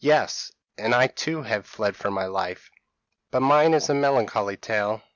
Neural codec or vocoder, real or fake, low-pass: none; real; 7.2 kHz